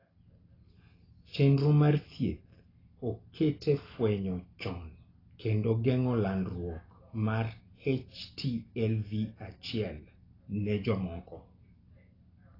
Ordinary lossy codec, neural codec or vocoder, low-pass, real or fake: AAC, 24 kbps; none; 5.4 kHz; real